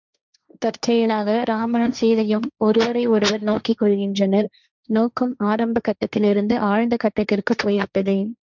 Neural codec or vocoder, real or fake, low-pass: codec, 16 kHz, 1.1 kbps, Voila-Tokenizer; fake; 7.2 kHz